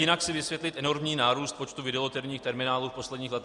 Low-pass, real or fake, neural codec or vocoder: 10.8 kHz; real; none